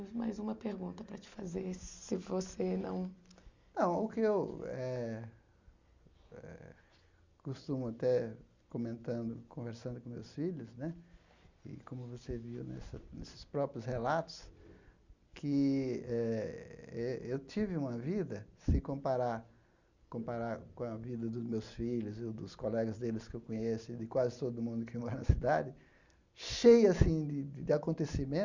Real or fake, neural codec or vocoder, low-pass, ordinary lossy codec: real; none; 7.2 kHz; Opus, 64 kbps